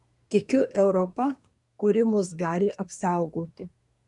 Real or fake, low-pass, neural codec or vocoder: fake; 10.8 kHz; codec, 24 kHz, 1 kbps, SNAC